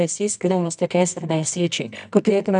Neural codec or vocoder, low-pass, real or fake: codec, 24 kHz, 0.9 kbps, WavTokenizer, medium music audio release; 10.8 kHz; fake